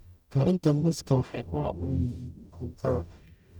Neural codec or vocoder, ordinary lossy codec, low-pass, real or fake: codec, 44.1 kHz, 0.9 kbps, DAC; none; 19.8 kHz; fake